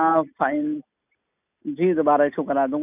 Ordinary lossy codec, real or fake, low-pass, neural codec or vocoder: none; real; 3.6 kHz; none